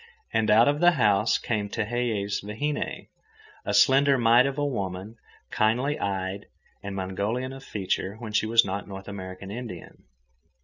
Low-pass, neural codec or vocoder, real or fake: 7.2 kHz; none; real